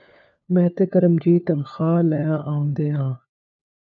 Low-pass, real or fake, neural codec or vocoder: 7.2 kHz; fake; codec, 16 kHz, 4 kbps, FunCodec, trained on LibriTTS, 50 frames a second